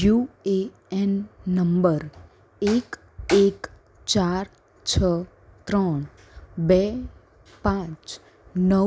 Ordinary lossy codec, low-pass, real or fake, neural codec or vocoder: none; none; real; none